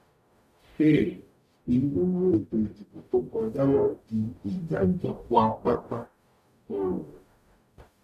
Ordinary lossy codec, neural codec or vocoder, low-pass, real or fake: none; codec, 44.1 kHz, 0.9 kbps, DAC; 14.4 kHz; fake